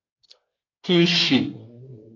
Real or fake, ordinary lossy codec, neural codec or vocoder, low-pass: fake; AAC, 32 kbps; codec, 24 kHz, 1 kbps, SNAC; 7.2 kHz